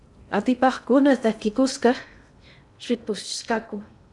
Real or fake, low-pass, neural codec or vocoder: fake; 10.8 kHz; codec, 16 kHz in and 24 kHz out, 0.6 kbps, FocalCodec, streaming, 2048 codes